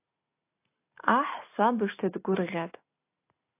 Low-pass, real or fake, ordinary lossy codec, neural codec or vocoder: 3.6 kHz; real; AAC, 24 kbps; none